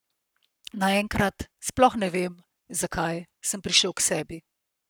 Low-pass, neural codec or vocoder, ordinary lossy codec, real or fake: none; codec, 44.1 kHz, 7.8 kbps, Pupu-Codec; none; fake